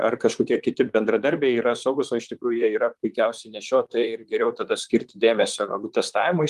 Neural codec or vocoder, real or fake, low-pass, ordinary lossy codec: vocoder, 44.1 kHz, 128 mel bands, Pupu-Vocoder; fake; 14.4 kHz; Opus, 32 kbps